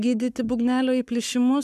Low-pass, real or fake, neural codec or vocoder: 14.4 kHz; fake; codec, 44.1 kHz, 7.8 kbps, Pupu-Codec